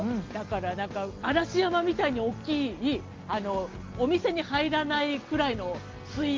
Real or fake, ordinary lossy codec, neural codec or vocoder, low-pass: real; Opus, 32 kbps; none; 7.2 kHz